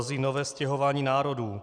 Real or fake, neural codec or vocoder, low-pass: real; none; 9.9 kHz